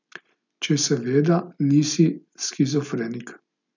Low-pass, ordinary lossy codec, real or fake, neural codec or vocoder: 7.2 kHz; none; real; none